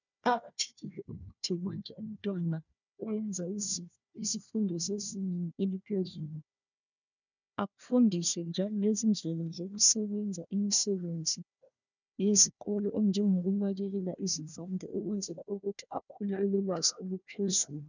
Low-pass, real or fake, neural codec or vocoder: 7.2 kHz; fake; codec, 16 kHz, 1 kbps, FunCodec, trained on Chinese and English, 50 frames a second